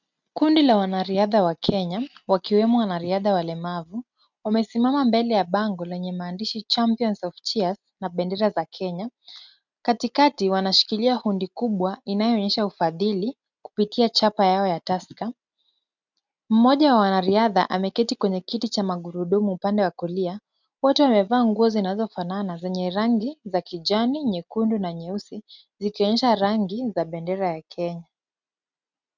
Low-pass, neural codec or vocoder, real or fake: 7.2 kHz; none; real